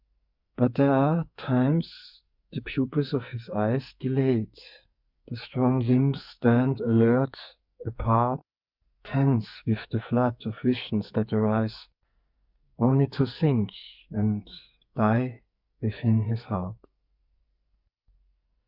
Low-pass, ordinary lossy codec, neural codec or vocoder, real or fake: 5.4 kHz; Opus, 64 kbps; codec, 44.1 kHz, 2.6 kbps, SNAC; fake